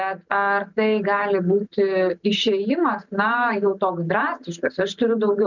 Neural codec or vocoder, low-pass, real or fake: none; 7.2 kHz; real